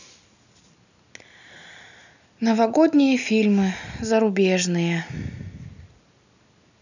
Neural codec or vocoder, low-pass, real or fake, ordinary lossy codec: none; 7.2 kHz; real; none